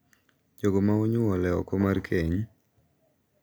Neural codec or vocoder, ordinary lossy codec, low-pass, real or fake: none; none; none; real